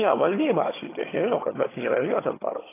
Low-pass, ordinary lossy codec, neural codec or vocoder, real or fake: 3.6 kHz; AAC, 16 kbps; vocoder, 22.05 kHz, 80 mel bands, HiFi-GAN; fake